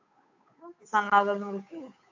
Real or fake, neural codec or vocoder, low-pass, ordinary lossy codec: fake; codec, 16 kHz, 8 kbps, FunCodec, trained on Chinese and English, 25 frames a second; 7.2 kHz; AAC, 48 kbps